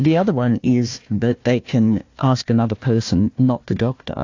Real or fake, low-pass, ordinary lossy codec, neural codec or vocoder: fake; 7.2 kHz; AAC, 48 kbps; codec, 16 kHz, 1 kbps, FunCodec, trained on Chinese and English, 50 frames a second